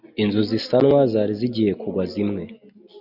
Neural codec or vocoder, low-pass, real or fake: none; 5.4 kHz; real